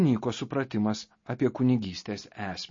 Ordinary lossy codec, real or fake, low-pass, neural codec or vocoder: MP3, 32 kbps; real; 7.2 kHz; none